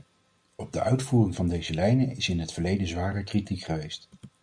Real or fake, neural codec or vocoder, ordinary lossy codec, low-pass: real; none; MP3, 96 kbps; 9.9 kHz